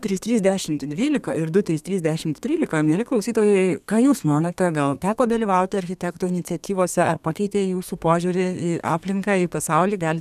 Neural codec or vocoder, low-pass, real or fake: codec, 32 kHz, 1.9 kbps, SNAC; 14.4 kHz; fake